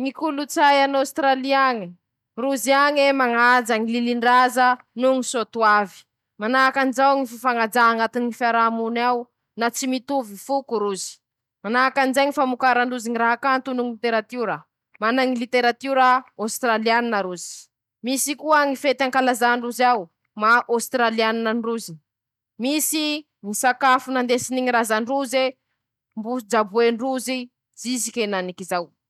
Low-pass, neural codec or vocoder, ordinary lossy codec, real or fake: 19.8 kHz; none; none; real